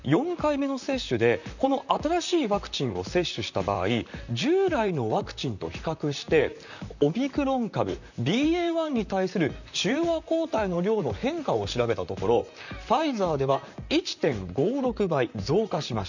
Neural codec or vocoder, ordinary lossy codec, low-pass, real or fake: vocoder, 44.1 kHz, 128 mel bands, Pupu-Vocoder; none; 7.2 kHz; fake